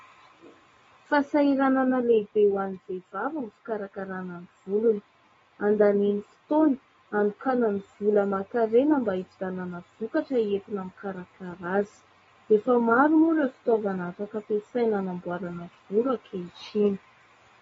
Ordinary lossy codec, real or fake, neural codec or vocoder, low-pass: AAC, 24 kbps; real; none; 19.8 kHz